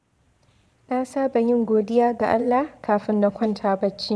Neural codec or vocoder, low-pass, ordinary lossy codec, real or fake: vocoder, 22.05 kHz, 80 mel bands, Vocos; none; none; fake